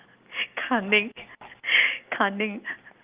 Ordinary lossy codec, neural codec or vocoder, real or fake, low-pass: Opus, 32 kbps; none; real; 3.6 kHz